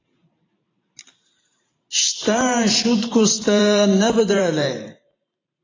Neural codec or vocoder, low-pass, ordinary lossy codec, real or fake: vocoder, 44.1 kHz, 128 mel bands every 512 samples, BigVGAN v2; 7.2 kHz; AAC, 32 kbps; fake